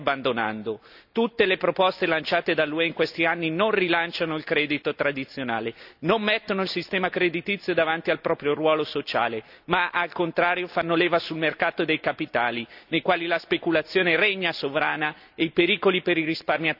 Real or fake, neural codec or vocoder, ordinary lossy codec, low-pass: real; none; none; 5.4 kHz